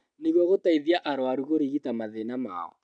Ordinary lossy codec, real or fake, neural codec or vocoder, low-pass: MP3, 96 kbps; real; none; 9.9 kHz